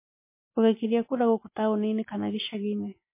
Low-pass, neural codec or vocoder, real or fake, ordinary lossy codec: 3.6 kHz; none; real; MP3, 24 kbps